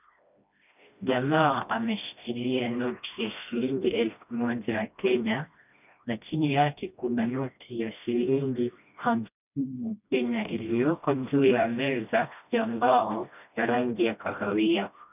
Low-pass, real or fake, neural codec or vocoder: 3.6 kHz; fake; codec, 16 kHz, 1 kbps, FreqCodec, smaller model